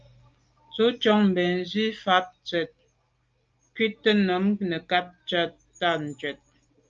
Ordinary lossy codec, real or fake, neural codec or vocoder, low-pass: Opus, 24 kbps; real; none; 7.2 kHz